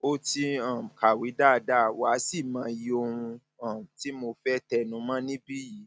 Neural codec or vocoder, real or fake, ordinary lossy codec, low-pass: none; real; none; none